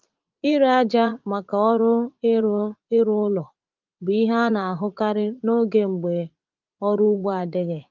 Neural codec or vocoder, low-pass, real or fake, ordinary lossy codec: vocoder, 44.1 kHz, 80 mel bands, Vocos; 7.2 kHz; fake; Opus, 32 kbps